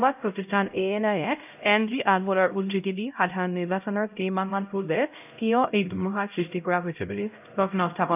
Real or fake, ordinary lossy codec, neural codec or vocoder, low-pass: fake; none; codec, 16 kHz, 0.5 kbps, X-Codec, HuBERT features, trained on LibriSpeech; 3.6 kHz